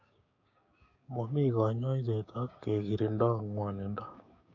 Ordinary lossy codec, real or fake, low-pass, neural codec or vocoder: AAC, 48 kbps; fake; 7.2 kHz; codec, 16 kHz, 6 kbps, DAC